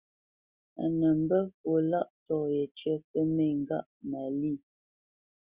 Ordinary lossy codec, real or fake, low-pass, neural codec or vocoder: Opus, 64 kbps; real; 3.6 kHz; none